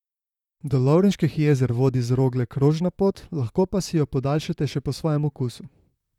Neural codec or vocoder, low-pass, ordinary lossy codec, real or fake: vocoder, 44.1 kHz, 128 mel bands, Pupu-Vocoder; 19.8 kHz; none; fake